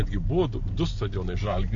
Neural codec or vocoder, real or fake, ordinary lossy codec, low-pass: none; real; MP3, 48 kbps; 7.2 kHz